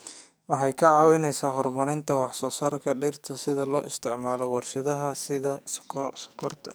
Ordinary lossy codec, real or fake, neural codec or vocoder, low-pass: none; fake; codec, 44.1 kHz, 2.6 kbps, SNAC; none